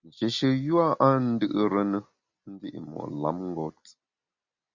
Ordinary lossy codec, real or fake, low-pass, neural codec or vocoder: Opus, 64 kbps; real; 7.2 kHz; none